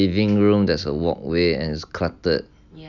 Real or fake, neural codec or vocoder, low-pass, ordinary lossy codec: real; none; 7.2 kHz; none